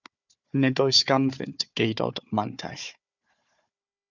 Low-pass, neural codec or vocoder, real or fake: 7.2 kHz; codec, 16 kHz, 4 kbps, FunCodec, trained on Chinese and English, 50 frames a second; fake